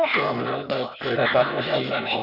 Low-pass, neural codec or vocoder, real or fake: 5.4 kHz; codec, 16 kHz, 0.8 kbps, ZipCodec; fake